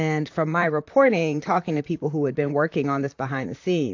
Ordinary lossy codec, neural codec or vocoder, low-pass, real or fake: AAC, 48 kbps; vocoder, 44.1 kHz, 128 mel bands, Pupu-Vocoder; 7.2 kHz; fake